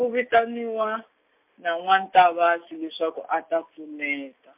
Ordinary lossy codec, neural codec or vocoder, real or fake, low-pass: MP3, 32 kbps; none; real; 3.6 kHz